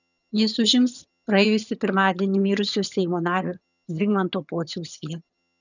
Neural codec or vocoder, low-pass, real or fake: vocoder, 22.05 kHz, 80 mel bands, HiFi-GAN; 7.2 kHz; fake